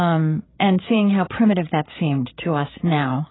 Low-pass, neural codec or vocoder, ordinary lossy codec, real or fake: 7.2 kHz; codec, 16 kHz, 16 kbps, FreqCodec, larger model; AAC, 16 kbps; fake